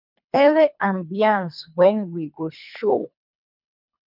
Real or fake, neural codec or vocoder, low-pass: fake; codec, 24 kHz, 3 kbps, HILCodec; 5.4 kHz